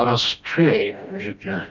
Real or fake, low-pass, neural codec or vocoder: fake; 7.2 kHz; codec, 16 kHz, 1 kbps, FreqCodec, smaller model